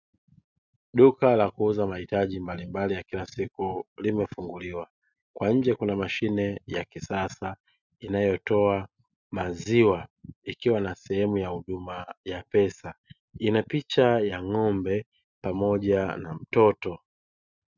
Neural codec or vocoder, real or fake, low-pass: none; real; 7.2 kHz